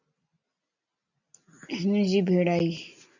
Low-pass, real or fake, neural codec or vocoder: 7.2 kHz; real; none